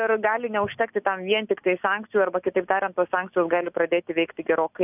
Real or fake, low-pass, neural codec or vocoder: real; 3.6 kHz; none